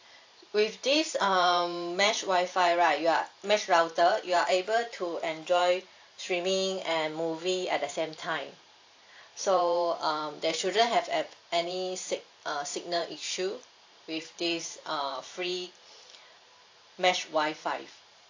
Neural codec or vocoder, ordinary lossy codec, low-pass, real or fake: vocoder, 44.1 kHz, 128 mel bands every 512 samples, BigVGAN v2; AAC, 48 kbps; 7.2 kHz; fake